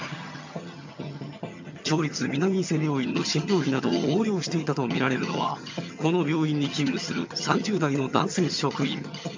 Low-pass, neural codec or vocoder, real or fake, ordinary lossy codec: 7.2 kHz; vocoder, 22.05 kHz, 80 mel bands, HiFi-GAN; fake; MP3, 64 kbps